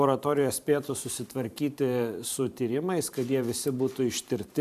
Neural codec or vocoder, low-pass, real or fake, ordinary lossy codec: vocoder, 44.1 kHz, 128 mel bands every 512 samples, BigVGAN v2; 14.4 kHz; fake; AAC, 96 kbps